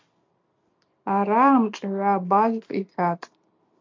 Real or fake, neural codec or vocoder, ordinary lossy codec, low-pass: real; none; MP3, 48 kbps; 7.2 kHz